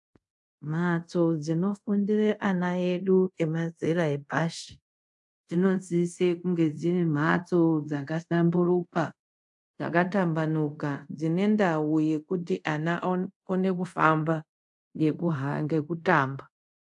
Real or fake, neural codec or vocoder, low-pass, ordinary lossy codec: fake; codec, 24 kHz, 0.5 kbps, DualCodec; 10.8 kHz; AAC, 64 kbps